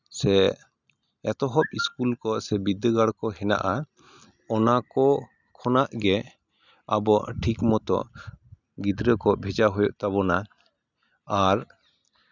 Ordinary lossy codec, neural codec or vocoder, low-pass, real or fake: none; none; 7.2 kHz; real